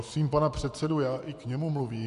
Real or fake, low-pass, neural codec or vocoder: fake; 10.8 kHz; vocoder, 24 kHz, 100 mel bands, Vocos